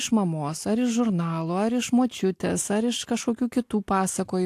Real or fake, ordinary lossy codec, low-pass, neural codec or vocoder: real; AAC, 64 kbps; 14.4 kHz; none